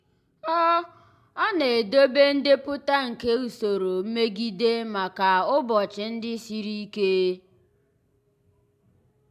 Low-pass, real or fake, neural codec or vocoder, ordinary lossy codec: 14.4 kHz; real; none; MP3, 96 kbps